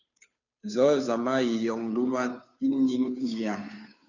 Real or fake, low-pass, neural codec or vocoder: fake; 7.2 kHz; codec, 16 kHz, 2 kbps, FunCodec, trained on Chinese and English, 25 frames a second